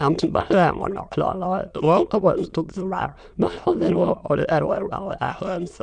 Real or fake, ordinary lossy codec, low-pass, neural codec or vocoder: fake; Opus, 64 kbps; 9.9 kHz; autoencoder, 22.05 kHz, a latent of 192 numbers a frame, VITS, trained on many speakers